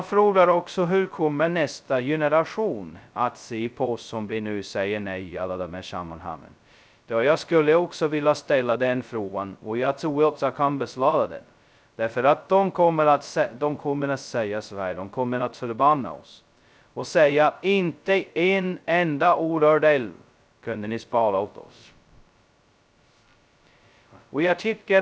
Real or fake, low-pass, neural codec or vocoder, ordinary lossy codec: fake; none; codec, 16 kHz, 0.2 kbps, FocalCodec; none